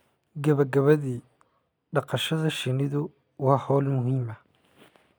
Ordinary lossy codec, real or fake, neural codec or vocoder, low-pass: none; real; none; none